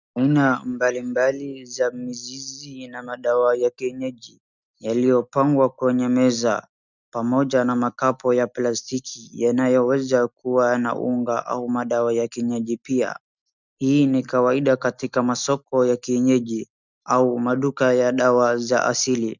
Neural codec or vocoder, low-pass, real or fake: none; 7.2 kHz; real